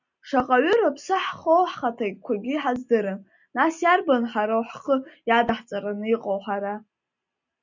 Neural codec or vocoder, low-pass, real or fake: none; 7.2 kHz; real